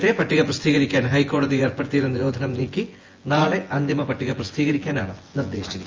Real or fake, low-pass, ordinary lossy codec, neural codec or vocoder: fake; 7.2 kHz; Opus, 32 kbps; vocoder, 24 kHz, 100 mel bands, Vocos